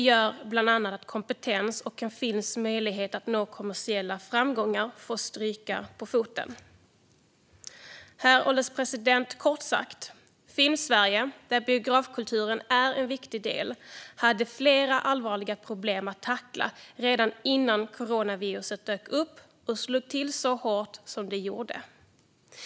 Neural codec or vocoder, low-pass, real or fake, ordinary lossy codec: none; none; real; none